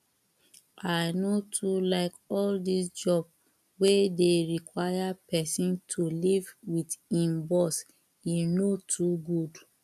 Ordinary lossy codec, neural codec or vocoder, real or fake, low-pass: none; none; real; 14.4 kHz